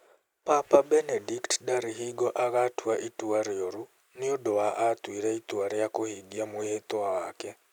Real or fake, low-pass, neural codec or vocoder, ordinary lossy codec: real; none; none; none